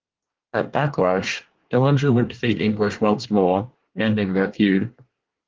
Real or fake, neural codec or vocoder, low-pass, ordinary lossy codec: fake; codec, 24 kHz, 1 kbps, SNAC; 7.2 kHz; Opus, 16 kbps